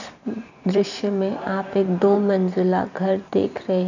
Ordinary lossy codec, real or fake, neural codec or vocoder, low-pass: none; fake; vocoder, 44.1 kHz, 128 mel bands every 512 samples, BigVGAN v2; 7.2 kHz